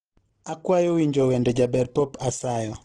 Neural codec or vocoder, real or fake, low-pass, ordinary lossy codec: none; real; 9.9 kHz; Opus, 16 kbps